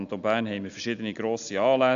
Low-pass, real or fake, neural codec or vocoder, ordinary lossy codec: 7.2 kHz; real; none; AAC, 96 kbps